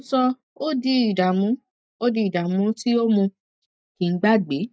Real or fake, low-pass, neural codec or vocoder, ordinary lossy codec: real; none; none; none